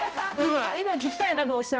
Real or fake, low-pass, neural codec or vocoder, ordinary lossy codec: fake; none; codec, 16 kHz, 0.5 kbps, X-Codec, HuBERT features, trained on balanced general audio; none